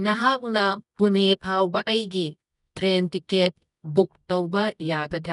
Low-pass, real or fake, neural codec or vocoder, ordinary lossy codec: 10.8 kHz; fake; codec, 24 kHz, 0.9 kbps, WavTokenizer, medium music audio release; none